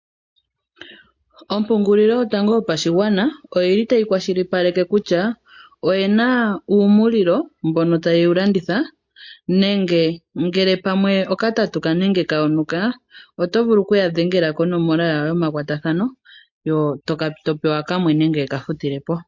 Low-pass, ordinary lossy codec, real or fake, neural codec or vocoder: 7.2 kHz; MP3, 48 kbps; real; none